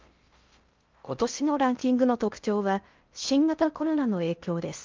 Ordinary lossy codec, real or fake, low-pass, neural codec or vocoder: Opus, 24 kbps; fake; 7.2 kHz; codec, 16 kHz in and 24 kHz out, 0.6 kbps, FocalCodec, streaming, 4096 codes